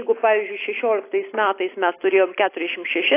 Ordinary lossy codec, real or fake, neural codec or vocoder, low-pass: AAC, 24 kbps; real; none; 3.6 kHz